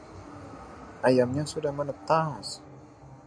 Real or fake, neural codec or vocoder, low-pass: real; none; 9.9 kHz